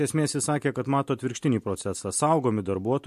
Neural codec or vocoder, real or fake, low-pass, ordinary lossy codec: none; real; 14.4 kHz; MP3, 64 kbps